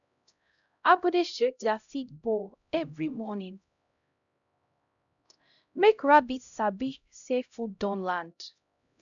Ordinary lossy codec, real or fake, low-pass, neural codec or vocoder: none; fake; 7.2 kHz; codec, 16 kHz, 0.5 kbps, X-Codec, HuBERT features, trained on LibriSpeech